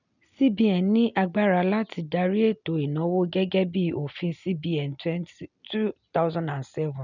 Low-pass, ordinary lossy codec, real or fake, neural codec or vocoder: 7.2 kHz; none; real; none